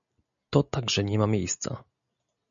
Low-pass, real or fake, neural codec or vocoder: 7.2 kHz; real; none